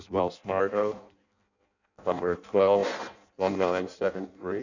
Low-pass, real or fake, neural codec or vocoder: 7.2 kHz; fake; codec, 16 kHz in and 24 kHz out, 0.6 kbps, FireRedTTS-2 codec